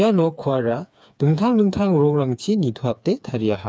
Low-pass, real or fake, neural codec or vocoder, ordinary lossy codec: none; fake; codec, 16 kHz, 4 kbps, FreqCodec, smaller model; none